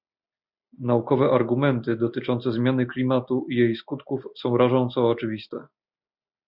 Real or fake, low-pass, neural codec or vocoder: real; 5.4 kHz; none